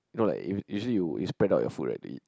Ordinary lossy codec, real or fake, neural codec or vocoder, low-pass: none; real; none; none